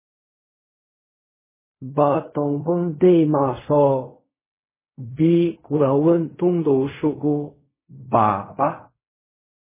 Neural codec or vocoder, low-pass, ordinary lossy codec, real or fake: codec, 16 kHz in and 24 kHz out, 0.4 kbps, LongCat-Audio-Codec, fine tuned four codebook decoder; 3.6 kHz; MP3, 16 kbps; fake